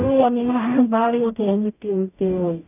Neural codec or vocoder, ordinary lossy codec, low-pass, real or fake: codec, 44.1 kHz, 0.9 kbps, DAC; none; 3.6 kHz; fake